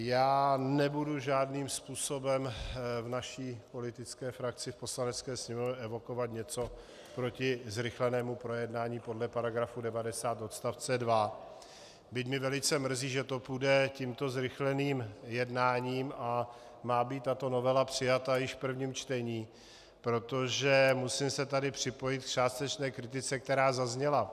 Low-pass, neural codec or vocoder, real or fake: 14.4 kHz; none; real